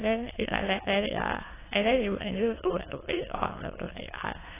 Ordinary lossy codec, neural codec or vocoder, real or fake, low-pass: AAC, 16 kbps; autoencoder, 22.05 kHz, a latent of 192 numbers a frame, VITS, trained on many speakers; fake; 3.6 kHz